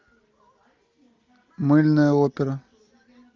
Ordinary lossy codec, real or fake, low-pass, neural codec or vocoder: Opus, 32 kbps; real; 7.2 kHz; none